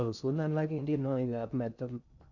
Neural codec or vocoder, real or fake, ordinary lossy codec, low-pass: codec, 16 kHz in and 24 kHz out, 0.6 kbps, FocalCodec, streaming, 2048 codes; fake; none; 7.2 kHz